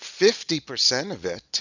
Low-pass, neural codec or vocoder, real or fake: 7.2 kHz; none; real